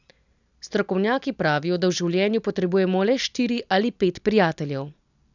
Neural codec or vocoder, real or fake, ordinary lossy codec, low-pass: none; real; none; 7.2 kHz